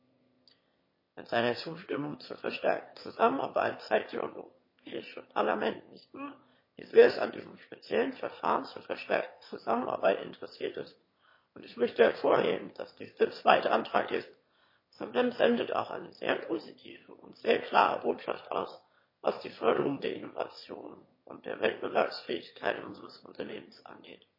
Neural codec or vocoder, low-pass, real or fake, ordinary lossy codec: autoencoder, 22.05 kHz, a latent of 192 numbers a frame, VITS, trained on one speaker; 5.4 kHz; fake; MP3, 24 kbps